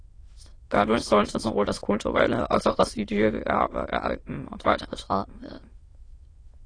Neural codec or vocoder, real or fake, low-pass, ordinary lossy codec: autoencoder, 22.05 kHz, a latent of 192 numbers a frame, VITS, trained on many speakers; fake; 9.9 kHz; AAC, 32 kbps